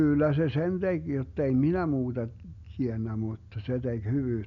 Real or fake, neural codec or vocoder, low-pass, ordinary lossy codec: real; none; 7.2 kHz; none